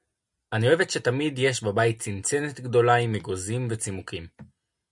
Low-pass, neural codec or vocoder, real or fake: 10.8 kHz; none; real